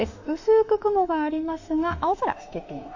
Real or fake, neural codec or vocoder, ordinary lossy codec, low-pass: fake; autoencoder, 48 kHz, 32 numbers a frame, DAC-VAE, trained on Japanese speech; AAC, 48 kbps; 7.2 kHz